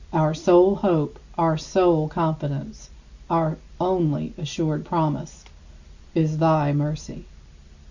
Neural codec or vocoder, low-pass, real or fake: none; 7.2 kHz; real